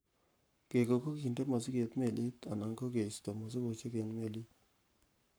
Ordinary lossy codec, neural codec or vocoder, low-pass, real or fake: none; codec, 44.1 kHz, 7.8 kbps, Pupu-Codec; none; fake